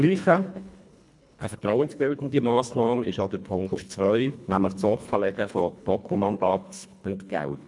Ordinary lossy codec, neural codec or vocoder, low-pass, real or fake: none; codec, 24 kHz, 1.5 kbps, HILCodec; 10.8 kHz; fake